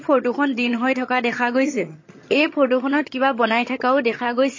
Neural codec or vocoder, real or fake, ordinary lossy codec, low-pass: vocoder, 22.05 kHz, 80 mel bands, HiFi-GAN; fake; MP3, 32 kbps; 7.2 kHz